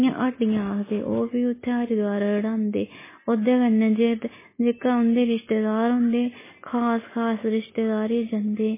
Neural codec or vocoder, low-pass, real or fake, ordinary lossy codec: none; 3.6 kHz; real; MP3, 16 kbps